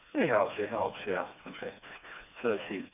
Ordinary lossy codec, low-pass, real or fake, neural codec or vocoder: none; 3.6 kHz; fake; codec, 16 kHz, 2 kbps, FreqCodec, smaller model